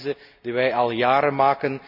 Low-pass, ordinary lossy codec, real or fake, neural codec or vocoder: 5.4 kHz; AAC, 48 kbps; real; none